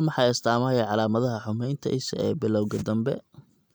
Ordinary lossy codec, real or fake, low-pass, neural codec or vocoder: none; real; none; none